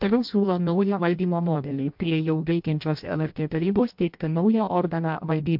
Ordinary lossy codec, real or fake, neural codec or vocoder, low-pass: MP3, 48 kbps; fake; codec, 16 kHz in and 24 kHz out, 0.6 kbps, FireRedTTS-2 codec; 5.4 kHz